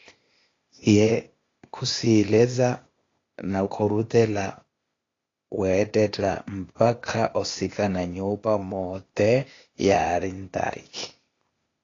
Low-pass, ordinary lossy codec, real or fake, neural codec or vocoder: 7.2 kHz; AAC, 32 kbps; fake; codec, 16 kHz, 0.8 kbps, ZipCodec